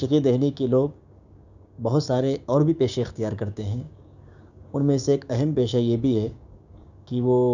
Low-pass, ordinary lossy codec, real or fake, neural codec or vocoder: 7.2 kHz; none; fake; codec, 16 kHz, 6 kbps, DAC